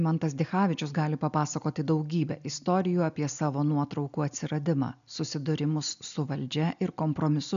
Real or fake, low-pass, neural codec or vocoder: real; 7.2 kHz; none